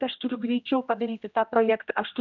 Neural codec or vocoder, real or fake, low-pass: codec, 16 kHz, 1 kbps, X-Codec, HuBERT features, trained on general audio; fake; 7.2 kHz